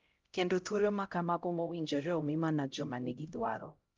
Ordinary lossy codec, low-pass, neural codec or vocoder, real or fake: Opus, 32 kbps; 7.2 kHz; codec, 16 kHz, 0.5 kbps, X-Codec, HuBERT features, trained on LibriSpeech; fake